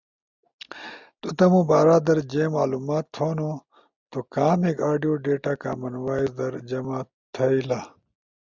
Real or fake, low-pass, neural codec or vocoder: real; 7.2 kHz; none